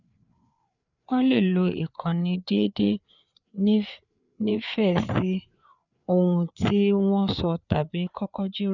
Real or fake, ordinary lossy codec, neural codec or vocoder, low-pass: fake; none; codec, 16 kHz, 4 kbps, FreqCodec, larger model; 7.2 kHz